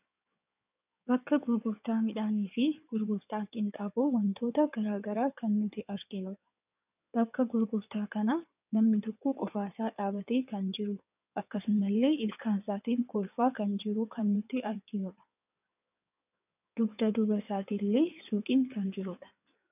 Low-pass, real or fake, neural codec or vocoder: 3.6 kHz; fake; codec, 16 kHz in and 24 kHz out, 2.2 kbps, FireRedTTS-2 codec